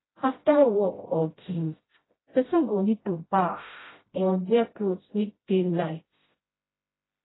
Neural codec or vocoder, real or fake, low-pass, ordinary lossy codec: codec, 16 kHz, 0.5 kbps, FreqCodec, smaller model; fake; 7.2 kHz; AAC, 16 kbps